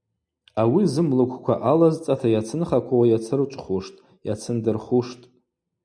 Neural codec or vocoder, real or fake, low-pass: none; real; 9.9 kHz